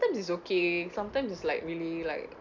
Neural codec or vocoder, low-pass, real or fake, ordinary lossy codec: none; 7.2 kHz; real; none